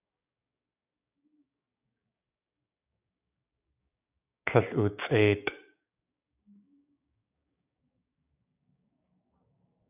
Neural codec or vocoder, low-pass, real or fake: codec, 16 kHz, 6 kbps, DAC; 3.6 kHz; fake